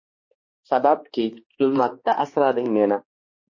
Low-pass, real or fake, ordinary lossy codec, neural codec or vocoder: 7.2 kHz; fake; MP3, 32 kbps; codec, 16 kHz, 2 kbps, X-Codec, HuBERT features, trained on balanced general audio